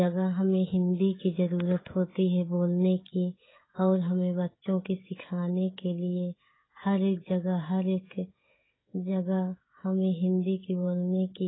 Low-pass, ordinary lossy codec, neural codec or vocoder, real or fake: 7.2 kHz; AAC, 16 kbps; codec, 16 kHz, 16 kbps, FreqCodec, smaller model; fake